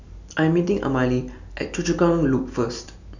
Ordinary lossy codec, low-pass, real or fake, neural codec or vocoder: none; 7.2 kHz; real; none